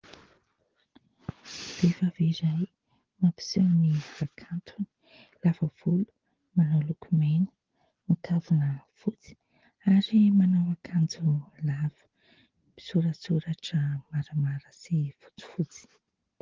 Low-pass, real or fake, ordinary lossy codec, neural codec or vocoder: 7.2 kHz; real; Opus, 16 kbps; none